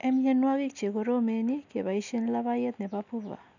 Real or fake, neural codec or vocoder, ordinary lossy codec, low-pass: real; none; none; 7.2 kHz